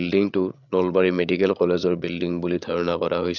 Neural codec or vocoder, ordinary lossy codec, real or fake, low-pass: codec, 16 kHz, 8 kbps, FreqCodec, larger model; none; fake; 7.2 kHz